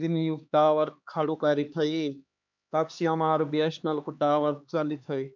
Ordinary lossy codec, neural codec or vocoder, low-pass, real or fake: MP3, 64 kbps; codec, 16 kHz, 2 kbps, X-Codec, HuBERT features, trained on LibriSpeech; 7.2 kHz; fake